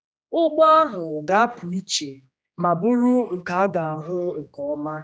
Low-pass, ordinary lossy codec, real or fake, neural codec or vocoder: none; none; fake; codec, 16 kHz, 1 kbps, X-Codec, HuBERT features, trained on general audio